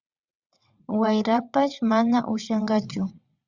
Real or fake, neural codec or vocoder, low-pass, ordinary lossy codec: fake; vocoder, 22.05 kHz, 80 mel bands, WaveNeXt; 7.2 kHz; Opus, 64 kbps